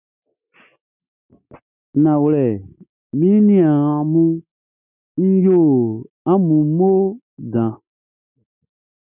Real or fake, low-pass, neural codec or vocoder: real; 3.6 kHz; none